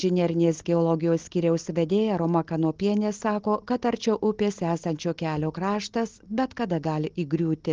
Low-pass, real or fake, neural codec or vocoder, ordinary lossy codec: 7.2 kHz; real; none; Opus, 16 kbps